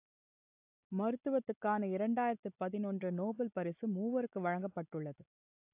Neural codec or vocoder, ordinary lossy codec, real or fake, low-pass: none; AAC, 32 kbps; real; 3.6 kHz